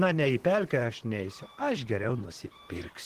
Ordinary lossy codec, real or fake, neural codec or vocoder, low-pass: Opus, 16 kbps; fake; vocoder, 44.1 kHz, 128 mel bands, Pupu-Vocoder; 14.4 kHz